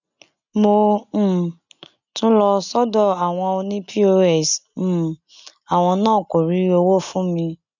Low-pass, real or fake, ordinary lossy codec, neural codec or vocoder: 7.2 kHz; real; none; none